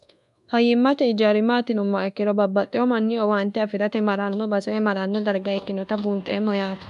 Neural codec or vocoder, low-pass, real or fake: codec, 24 kHz, 1.2 kbps, DualCodec; 10.8 kHz; fake